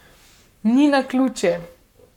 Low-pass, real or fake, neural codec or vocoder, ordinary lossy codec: 19.8 kHz; fake; codec, 44.1 kHz, 7.8 kbps, Pupu-Codec; none